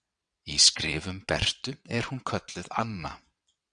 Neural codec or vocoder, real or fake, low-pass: vocoder, 22.05 kHz, 80 mel bands, WaveNeXt; fake; 9.9 kHz